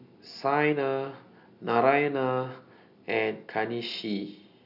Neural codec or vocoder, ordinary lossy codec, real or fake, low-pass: none; none; real; 5.4 kHz